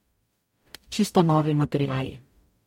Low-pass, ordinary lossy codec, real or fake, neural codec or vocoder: 19.8 kHz; MP3, 64 kbps; fake; codec, 44.1 kHz, 0.9 kbps, DAC